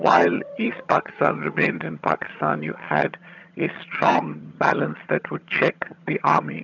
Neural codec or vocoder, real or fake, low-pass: vocoder, 22.05 kHz, 80 mel bands, HiFi-GAN; fake; 7.2 kHz